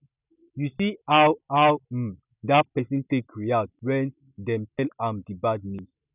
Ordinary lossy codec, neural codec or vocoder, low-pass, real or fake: none; none; 3.6 kHz; real